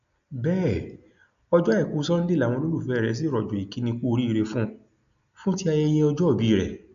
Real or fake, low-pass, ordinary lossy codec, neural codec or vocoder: real; 7.2 kHz; none; none